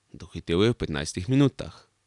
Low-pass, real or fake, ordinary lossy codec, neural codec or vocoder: 10.8 kHz; real; none; none